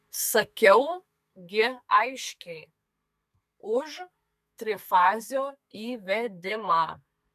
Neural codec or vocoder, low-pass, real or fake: codec, 32 kHz, 1.9 kbps, SNAC; 14.4 kHz; fake